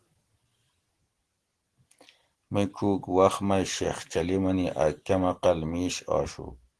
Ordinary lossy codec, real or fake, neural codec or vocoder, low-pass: Opus, 16 kbps; real; none; 10.8 kHz